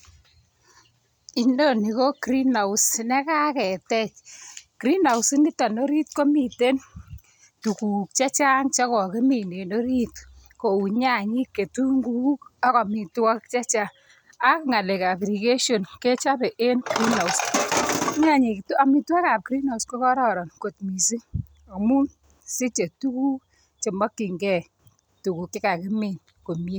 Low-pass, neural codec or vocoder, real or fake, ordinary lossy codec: none; none; real; none